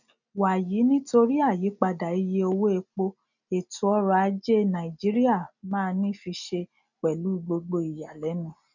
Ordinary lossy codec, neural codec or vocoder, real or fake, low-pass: none; none; real; 7.2 kHz